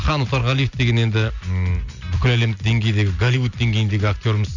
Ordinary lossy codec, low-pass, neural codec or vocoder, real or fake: none; 7.2 kHz; none; real